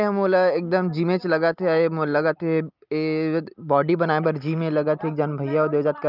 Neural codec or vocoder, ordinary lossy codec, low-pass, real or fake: none; Opus, 24 kbps; 5.4 kHz; real